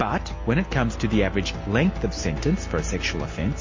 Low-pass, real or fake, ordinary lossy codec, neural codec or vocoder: 7.2 kHz; real; MP3, 32 kbps; none